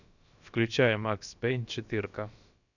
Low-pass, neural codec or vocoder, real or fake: 7.2 kHz; codec, 16 kHz, about 1 kbps, DyCAST, with the encoder's durations; fake